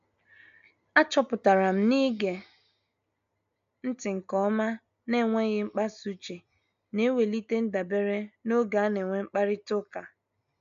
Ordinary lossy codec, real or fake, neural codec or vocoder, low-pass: AAC, 96 kbps; real; none; 7.2 kHz